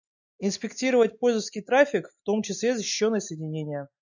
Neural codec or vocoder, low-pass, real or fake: none; 7.2 kHz; real